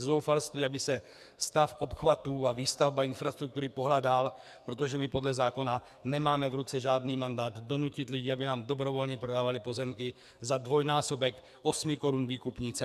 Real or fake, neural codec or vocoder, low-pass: fake; codec, 44.1 kHz, 2.6 kbps, SNAC; 14.4 kHz